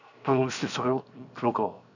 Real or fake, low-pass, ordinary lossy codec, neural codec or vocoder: fake; 7.2 kHz; none; codec, 16 kHz, 0.7 kbps, FocalCodec